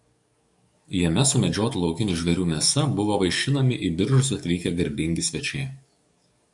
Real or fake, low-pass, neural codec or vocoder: fake; 10.8 kHz; codec, 44.1 kHz, 7.8 kbps, DAC